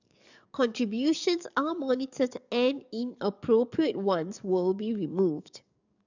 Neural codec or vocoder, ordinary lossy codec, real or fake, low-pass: codec, 44.1 kHz, 7.8 kbps, DAC; none; fake; 7.2 kHz